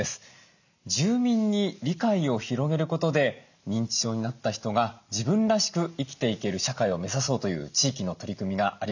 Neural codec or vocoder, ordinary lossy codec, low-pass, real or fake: none; none; 7.2 kHz; real